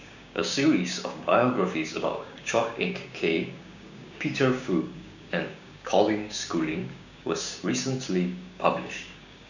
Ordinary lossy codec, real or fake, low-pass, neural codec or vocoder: none; fake; 7.2 kHz; codec, 16 kHz, 6 kbps, DAC